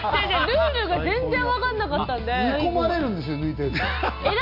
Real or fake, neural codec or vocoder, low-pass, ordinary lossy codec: real; none; 5.4 kHz; MP3, 32 kbps